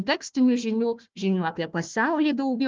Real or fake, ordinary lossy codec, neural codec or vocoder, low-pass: fake; Opus, 24 kbps; codec, 16 kHz, 1 kbps, FunCodec, trained on Chinese and English, 50 frames a second; 7.2 kHz